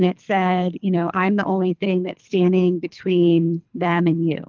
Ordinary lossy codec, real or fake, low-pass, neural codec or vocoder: Opus, 32 kbps; fake; 7.2 kHz; codec, 24 kHz, 3 kbps, HILCodec